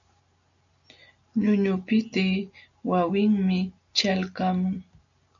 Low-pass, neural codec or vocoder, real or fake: 7.2 kHz; none; real